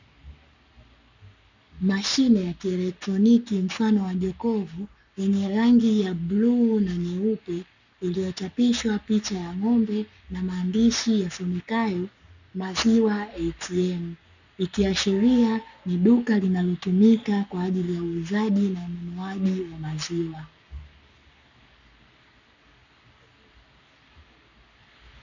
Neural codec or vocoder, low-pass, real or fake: codec, 44.1 kHz, 7.8 kbps, Pupu-Codec; 7.2 kHz; fake